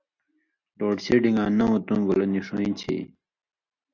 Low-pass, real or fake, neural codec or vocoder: 7.2 kHz; real; none